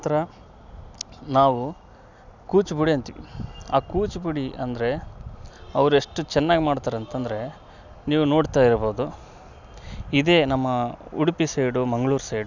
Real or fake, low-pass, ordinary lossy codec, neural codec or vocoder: real; 7.2 kHz; none; none